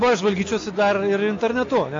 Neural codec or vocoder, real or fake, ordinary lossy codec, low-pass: none; real; AAC, 48 kbps; 7.2 kHz